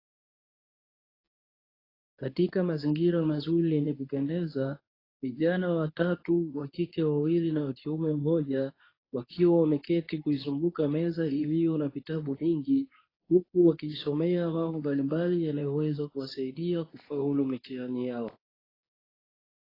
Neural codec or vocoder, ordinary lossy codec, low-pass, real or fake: codec, 24 kHz, 0.9 kbps, WavTokenizer, medium speech release version 2; AAC, 24 kbps; 5.4 kHz; fake